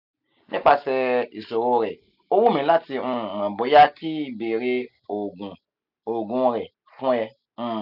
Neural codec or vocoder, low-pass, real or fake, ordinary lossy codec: none; 5.4 kHz; real; none